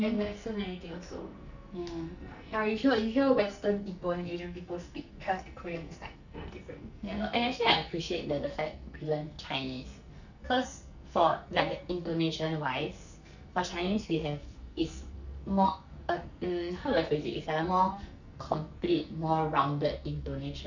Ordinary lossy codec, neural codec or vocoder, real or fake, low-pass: none; codec, 44.1 kHz, 2.6 kbps, SNAC; fake; 7.2 kHz